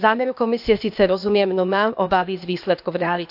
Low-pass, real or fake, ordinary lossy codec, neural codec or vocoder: 5.4 kHz; fake; AAC, 48 kbps; codec, 16 kHz, 0.8 kbps, ZipCodec